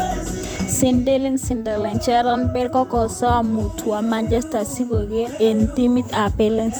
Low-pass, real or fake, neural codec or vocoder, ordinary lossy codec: none; fake; vocoder, 44.1 kHz, 128 mel bands, Pupu-Vocoder; none